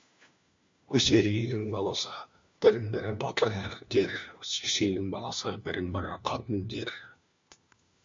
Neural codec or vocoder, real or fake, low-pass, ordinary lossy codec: codec, 16 kHz, 1 kbps, FunCodec, trained on LibriTTS, 50 frames a second; fake; 7.2 kHz; MP3, 48 kbps